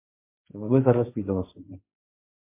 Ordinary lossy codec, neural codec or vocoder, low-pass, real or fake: MP3, 24 kbps; codec, 16 kHz, 1.1 kbps, Voila-Tokenizer; 3.6 kHz; fake